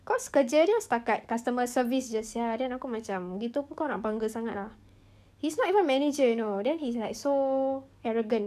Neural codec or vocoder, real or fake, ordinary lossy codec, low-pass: codec, 44.1 kHz, 7.8 kbps, DAC; fake; none; 14.4 kHz